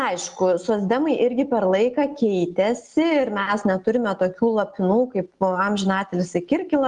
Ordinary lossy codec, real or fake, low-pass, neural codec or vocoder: Opus, 24 kbps; real; 10.8 kHz; none